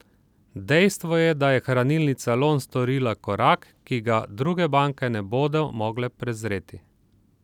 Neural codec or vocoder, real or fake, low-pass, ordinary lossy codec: none; real; 19.8 kHz; none